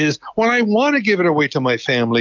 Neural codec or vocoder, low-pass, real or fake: none; 7.2 kHz; real